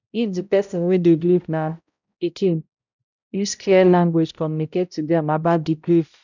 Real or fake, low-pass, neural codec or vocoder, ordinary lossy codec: fake; 7.2 kHz; codec, 16 kHz, 0.5 kbps, X-Codec, HuBERT features, trained on balanced general audio; none